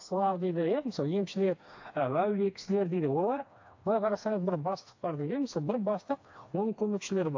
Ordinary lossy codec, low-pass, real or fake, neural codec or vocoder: AAC, 48 kbps; 7.2 kHz; fake; codec, 16 kHz, 2 kbps, FreqCodec, smaller model